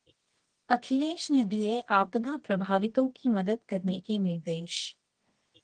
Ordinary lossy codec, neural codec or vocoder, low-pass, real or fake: Opus, 24 kbps; codec, 24 kHz, 0.9 kbps, WavTokenizer, medium music audio release; 9.9 kHz; fake